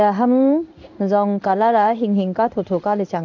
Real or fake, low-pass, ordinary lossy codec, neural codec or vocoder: fake; 7.2 kHz; none; codec, 16 kHz in and 24 kHz out, 1 kbps, XY-Tokenizer